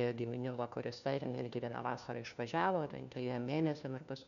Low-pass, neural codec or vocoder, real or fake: 7.2 kHz; codec, 16 kHz, 1 kbps, FunCodec, trained on LibriTTS, 50 frames a second; fake